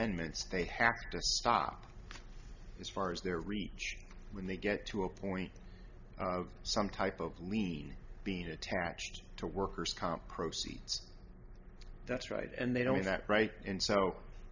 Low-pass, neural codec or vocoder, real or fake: 7.2 kHz; none; real